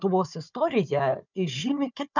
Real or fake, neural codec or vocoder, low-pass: fake; codec, 16 kHz, 4 kbps, FunCodec, trained on Chinese and English, 50 frames a second; 7.2 kHz